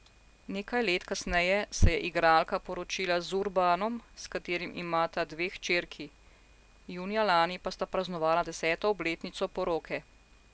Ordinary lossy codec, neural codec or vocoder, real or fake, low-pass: none; none; real; none